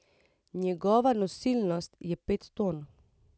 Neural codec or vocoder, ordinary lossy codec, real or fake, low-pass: none; none; real; none